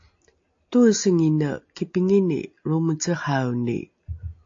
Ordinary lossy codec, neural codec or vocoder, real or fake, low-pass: AAC, 48 kbps; none; real; 7.2 kHz